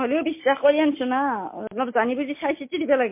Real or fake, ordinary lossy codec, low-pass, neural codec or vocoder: real; MP3, 24 kbps; 3.6 kHz; none